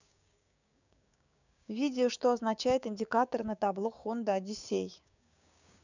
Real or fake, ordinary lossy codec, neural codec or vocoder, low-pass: fake; none; codec, 16 kHz, 6 kbps, DAC; 7.2 kHz